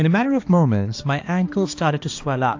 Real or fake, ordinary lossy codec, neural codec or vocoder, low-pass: fake; AAC, 48 kbps; codec, 16 kHz, 2 kbps, X-Codec, HuBERT features, trained on balanced general audio; 7.2 kHz